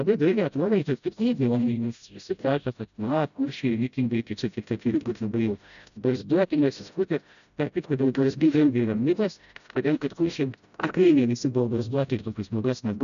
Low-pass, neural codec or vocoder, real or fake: 7.2 kHz; codec, 16 kHz, 0.5 kbps, FreqCodec, smaller model; fake